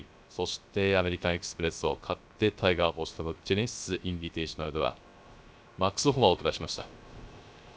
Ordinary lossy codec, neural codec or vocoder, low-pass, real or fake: none; codec, 16 kHz, 0.3 kbps, FocalCodec; none; fake